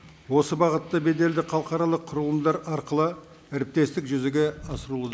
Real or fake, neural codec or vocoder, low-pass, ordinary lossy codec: real; none; none; none